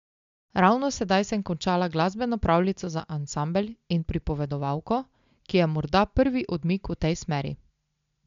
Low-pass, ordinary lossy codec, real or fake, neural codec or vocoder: 7.2 kHz; MP3, 64 kbps; real; none